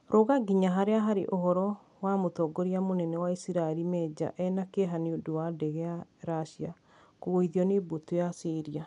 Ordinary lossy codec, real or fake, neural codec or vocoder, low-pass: none; real; none; 10.8 kHz